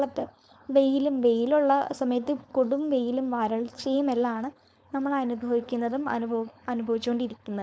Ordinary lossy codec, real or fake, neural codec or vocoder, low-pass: none; fake; codec, 16 kHz, 4.8 kbps, FACodec; none